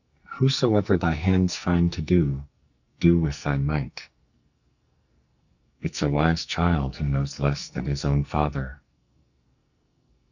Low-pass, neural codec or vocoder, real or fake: 7.2 kHz; codec, 44.1 kHz, 2.6 kbps, SNAC; fake